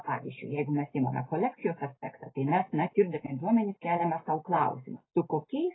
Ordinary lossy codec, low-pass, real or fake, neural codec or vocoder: AAC, 16 kbps; 7.2 kHz; real; none